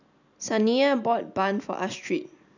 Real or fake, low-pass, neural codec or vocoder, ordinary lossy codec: real; 7.2 kHz; none; none